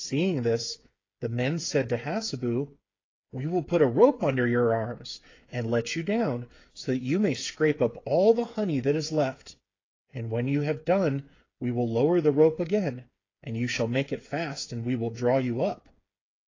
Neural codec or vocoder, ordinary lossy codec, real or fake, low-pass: codec, 16 kHz, 8 kbps, FreqCodec, smaller model; AAC, 32 kbps; fake; 7.2 kHz